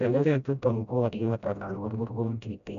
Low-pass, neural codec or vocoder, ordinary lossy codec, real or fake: 7.2 kHz; codec, 16 kHz, 0.5 kbps, FreqCodec, smaller model; none; fake